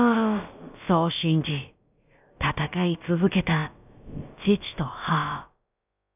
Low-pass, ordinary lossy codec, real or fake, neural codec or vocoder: 3.6 kHz; none; fake; codec, 16 kHz, about 1 kbps, DyCAST, with the encoder's durations